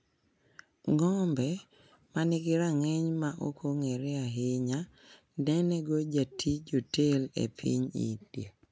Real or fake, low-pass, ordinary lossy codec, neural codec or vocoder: real; none; none; none